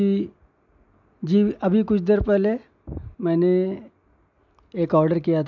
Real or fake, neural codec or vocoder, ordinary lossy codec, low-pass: real; none; MP3, 64 kbps; 7.2 kHz